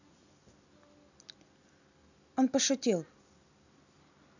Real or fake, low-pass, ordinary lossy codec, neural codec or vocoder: real; 7.2 kHz; none; none